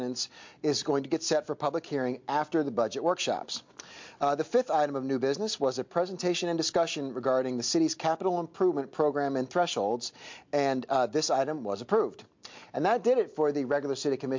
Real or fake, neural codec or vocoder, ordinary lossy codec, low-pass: real; none; MP3, 48 kbps; 7.2 kHz